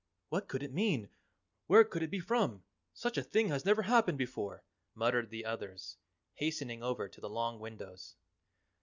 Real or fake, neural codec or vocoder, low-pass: real; none; 7.2 kHz